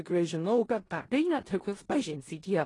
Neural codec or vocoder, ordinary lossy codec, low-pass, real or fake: codec, 16 kHz in and 24 kHz out, 0.4 kbps, LongCat-Audio-Codec, four codebook decoder; AAC, 32 kbps; 10.8 kHz; fake